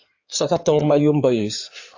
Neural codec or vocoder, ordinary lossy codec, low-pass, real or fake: codec, 16 kHz in and 24 kHz out, 2.2 kbps, FireRedTTS-2 codec; AAC, 48 kbps; 7.2 kHz; fake